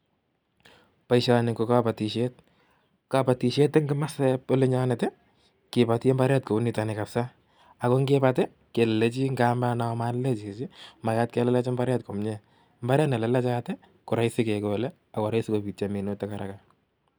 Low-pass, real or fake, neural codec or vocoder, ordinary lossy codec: none; real; none; none